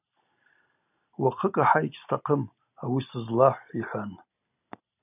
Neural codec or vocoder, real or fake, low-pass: none; real; 3.6 kHz